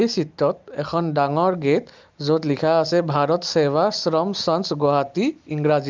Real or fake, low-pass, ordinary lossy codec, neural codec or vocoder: real; 7.2 kHz; Opus, 32 kbps; none